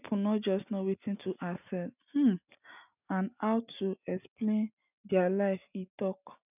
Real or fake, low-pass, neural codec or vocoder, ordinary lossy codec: real; 3.6 kHz; none; none